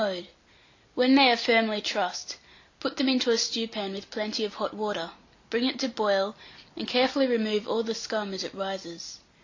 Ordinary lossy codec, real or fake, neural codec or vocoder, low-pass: AAC, 48 kbps; real; none; 7.2 kHz